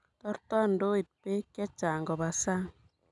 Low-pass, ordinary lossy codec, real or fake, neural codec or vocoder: 10.8 kHz; none; real; none